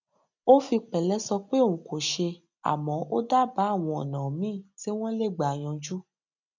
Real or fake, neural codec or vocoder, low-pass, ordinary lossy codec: real; none; 7.2 kHz; none